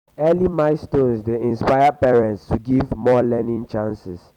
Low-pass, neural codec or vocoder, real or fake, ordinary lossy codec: 19.8 kHz; vocoder, 44.1 kHz, 128 mel bands every 256 samples, BigVGAN v2; fake; none